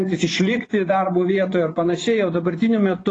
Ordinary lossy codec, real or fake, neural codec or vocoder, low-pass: AAC, 32 kbps; fake; vocoder, 24 kHz, 100 mel bands, Vocos; 10.8 kHz